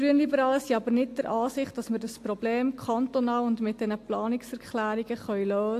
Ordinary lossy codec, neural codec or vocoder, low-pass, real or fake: AAC, 64 kbps; none; 14.4 kHz; real